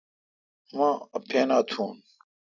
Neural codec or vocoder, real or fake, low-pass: none; real; 7.2 kHz